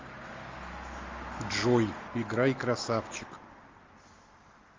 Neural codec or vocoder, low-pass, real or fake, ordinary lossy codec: none; 7.2 kHz; real; Opus, 32 kbps